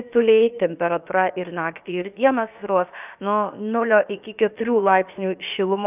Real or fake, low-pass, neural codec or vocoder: fake; 3.6 kHz; codec, 16 kHz, about 1 kbps, DyCAST, with the encoder's durations